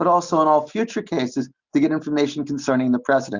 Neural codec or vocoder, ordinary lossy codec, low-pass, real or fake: none; Opus, 64 kbps; 7.2 kHz; real